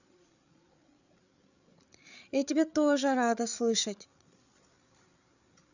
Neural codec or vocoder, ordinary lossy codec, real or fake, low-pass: codec, 16 kHz, 8 kbps, FreqCodec, larger model; none; fake; 7.2 kHz